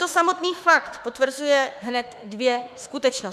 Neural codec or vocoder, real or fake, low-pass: autoencoder, 48 kHz, 32 numbers a frame, DAC-VAE, trained on Japanese speech; fake; 14.4 kHz